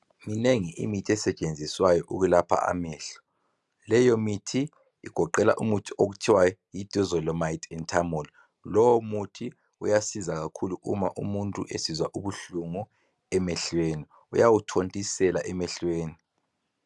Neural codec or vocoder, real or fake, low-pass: none; real; 10.8 kHz